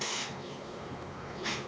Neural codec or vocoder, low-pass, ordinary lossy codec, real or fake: codec, 16 kHz, 2 kbps, X-Codec, WavLM features, trained on Multilingual LibriSpeech; none; none; fake